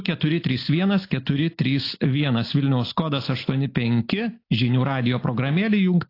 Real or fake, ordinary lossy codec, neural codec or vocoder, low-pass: real; AAC, 32 kbps; none; 5.4 kHz